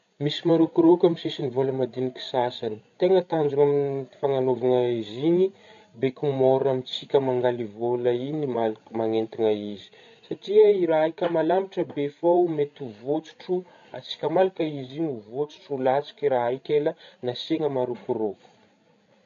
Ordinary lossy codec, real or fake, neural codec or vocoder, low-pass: MP3, 48 kbps; fake; codec, 16 kHz, 16 kbps, FreqCodec, larger model; 7.2 kHz